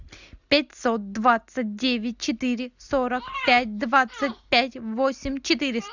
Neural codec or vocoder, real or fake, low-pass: none; real; 7.2 kHz